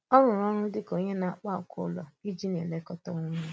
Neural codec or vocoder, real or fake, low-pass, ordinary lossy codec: none; real; none; none